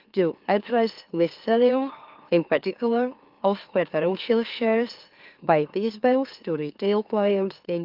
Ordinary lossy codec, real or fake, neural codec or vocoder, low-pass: Opus, 32 kbps; fake; autoencoder, 44.1 kHz, a latent of 192 numbers a frame, MeloTTS; 5.4 kHz